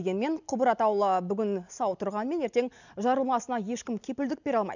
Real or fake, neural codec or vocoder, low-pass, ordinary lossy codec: real; none; 7.2 kHz; none